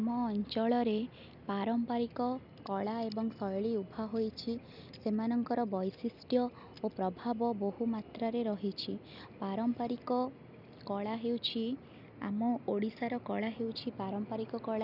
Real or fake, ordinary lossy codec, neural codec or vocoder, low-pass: real; none; none; 5.4 kHz